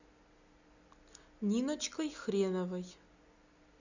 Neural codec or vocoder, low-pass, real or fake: none; 7.2 kHz; real